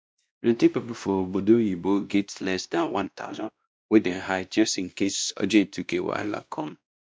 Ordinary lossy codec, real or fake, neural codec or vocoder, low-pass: none; fake; codec, 16 kHz, 1 kbps, X-Codec, WavLM features, trained on Multilingual LibriSpeech; none